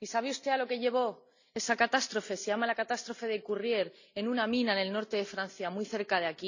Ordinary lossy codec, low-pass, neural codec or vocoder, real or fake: none; 7.2 kHz; none; real